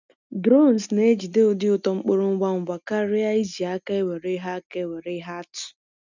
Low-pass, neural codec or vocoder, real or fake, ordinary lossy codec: 7.2 kHz; none; real; none